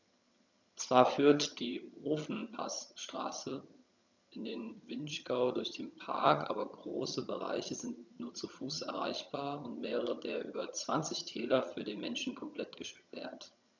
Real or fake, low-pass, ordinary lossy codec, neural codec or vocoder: fake; 7.2 kHz; none; vocoder, 22.05 kHz, 80 mel bands, HiFi-GAN